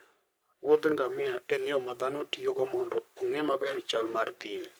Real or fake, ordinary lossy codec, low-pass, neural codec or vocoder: fake; none; none; codec, 44.1 kHz, 3.4 kbps, Pupu-Codec